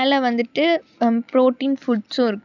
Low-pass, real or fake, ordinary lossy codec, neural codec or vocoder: 7.2 kHz; real; none; none